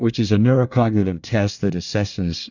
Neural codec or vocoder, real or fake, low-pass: codec, 24 kHz, 1 kbps, SNAC; fake; 7.2 kHz